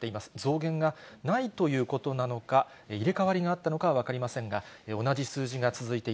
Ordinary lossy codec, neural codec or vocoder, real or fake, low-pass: none; none; real; none